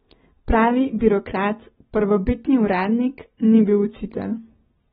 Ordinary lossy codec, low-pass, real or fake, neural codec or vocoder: AAC, 16 kbps; 19.8 kHz; real; none